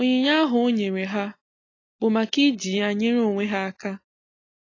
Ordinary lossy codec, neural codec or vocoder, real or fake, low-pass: AAC, 32 kbps; none; real; 7.2 kHz